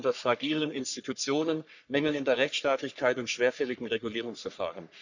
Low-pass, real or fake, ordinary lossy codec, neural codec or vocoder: 7.2 kHz; fake; none; codec, 44.1 kHz, 3.4 kbps, Pupu-Codec